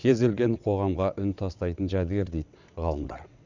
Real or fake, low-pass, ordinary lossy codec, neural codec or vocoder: fake; 7.2 kHz; none; vocoder, 22.05 kHz, 80 mel bands, WaveNeXt